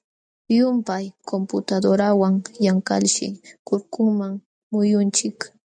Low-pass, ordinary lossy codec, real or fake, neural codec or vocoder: 9.9 kHz; MP3, 96 kbps; real; none